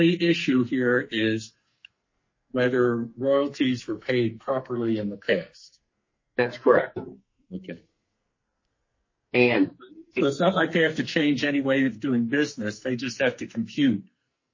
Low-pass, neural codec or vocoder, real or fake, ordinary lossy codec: 7.2 kHz; codec, 44.1 kHz, 2.6 kbps, SNAC; fake; MP3, 32 kbps